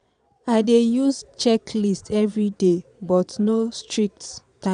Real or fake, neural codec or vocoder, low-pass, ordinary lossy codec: fake; vocoder, 22.05 kHz, 80 mel bands, Vocos; 9.9 kHz; none